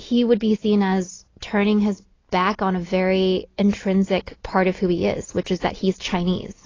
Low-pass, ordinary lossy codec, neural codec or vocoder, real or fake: 7.2 kHz; AAC, 32 kbps; none; real